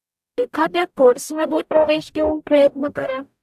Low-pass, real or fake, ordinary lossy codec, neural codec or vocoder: 14.4 kHz; fake; AAC, 96 kbps; codec, 44.1 kHz, 0.9 kbps, DAC